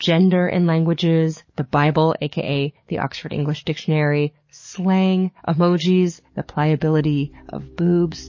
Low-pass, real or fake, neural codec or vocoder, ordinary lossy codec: 7.2 kHz; fake; codec, 44.1 kHz, 7.8 kbps, DAC; MP3, 32 kbps